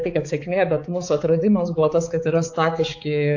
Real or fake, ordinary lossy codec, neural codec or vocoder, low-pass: fake; AAC, 48 kbps; codec, 16 kHz, 4 kbps, X-Codec, HuBERT features, trained on balanced general audio; 7.2 kHz